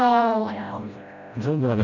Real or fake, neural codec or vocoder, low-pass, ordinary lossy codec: fake; codec, 16 kHz, 0.5 kbps, FreqCodec, smaller model; 7.2 kHz; none